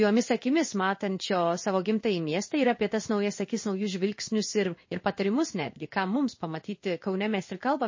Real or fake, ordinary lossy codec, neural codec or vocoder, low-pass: fake; MP3, 32 kbps; codec, 16 kHz in and 24 kHz out, 1 kbps, XY-Tokenizer; 7.2 kHz